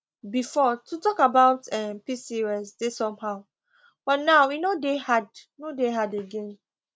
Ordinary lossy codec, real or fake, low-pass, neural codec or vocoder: none; real; none; none